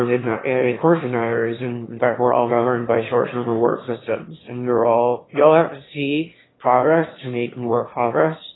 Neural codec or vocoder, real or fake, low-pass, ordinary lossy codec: autoencoder, 22.05 kHz, a latent of 192 numbers a frame, VITS, trained on one speaker; fake; 7.2 kHz; AAC, 16 kbps